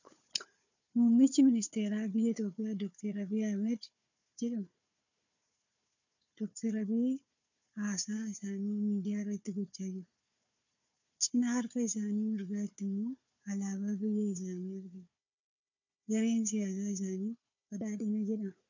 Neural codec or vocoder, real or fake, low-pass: codec, 16 kHz, 4 kbps, FunCodec, trained on Chinese and English, 50 frames a second; fake; 7.2 kHz